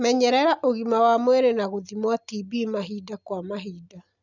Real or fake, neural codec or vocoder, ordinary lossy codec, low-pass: real; none; none; 7.2 kHz